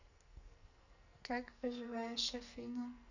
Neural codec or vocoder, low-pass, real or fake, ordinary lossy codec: codec, 44.1 kHz, 2.6 kbps, SNAC; 7.2 kHz; fake; none